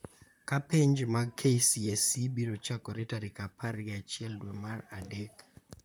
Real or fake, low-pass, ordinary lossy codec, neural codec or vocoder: fake; none; none; vocoder, 44.1 kHz, 128 mel bands, Pupu-Vocoder